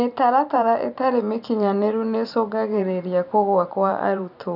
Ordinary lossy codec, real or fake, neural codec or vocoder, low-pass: none; fake; vocoder, 44.1 kHz, 128 mel bands every 512 samples, BigVGAN v2; 5.4 kHz